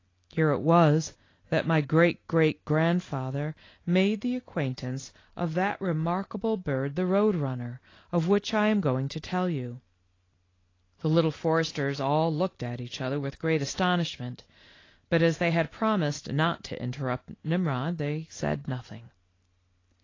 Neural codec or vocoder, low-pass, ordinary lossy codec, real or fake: none; 7.2 kHz; AAC, 32 kbps; real